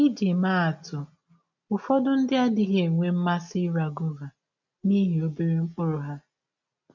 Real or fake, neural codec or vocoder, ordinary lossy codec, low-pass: real; none; none; 7.2 kHz